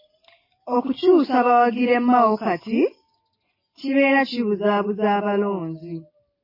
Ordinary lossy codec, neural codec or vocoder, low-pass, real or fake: MP3, 24 kbps; none; 5.4 kHz; real